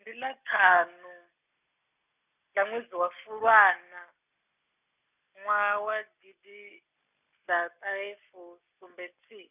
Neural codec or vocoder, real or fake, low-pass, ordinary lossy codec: none; real; 3.6 kHz; none